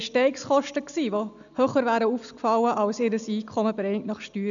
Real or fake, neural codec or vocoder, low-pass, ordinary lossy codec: real; none; 7.2 kHz; none